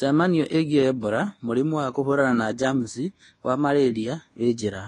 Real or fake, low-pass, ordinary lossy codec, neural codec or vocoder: fake; 10.8 kHz; AAC, 32 kbps; codec, 24 kHz, 1.2 kbps, DualCodec